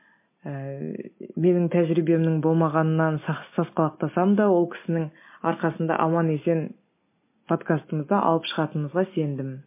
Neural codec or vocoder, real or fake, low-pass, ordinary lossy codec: none; real; 3.6 kHz; MP3, 24 kbps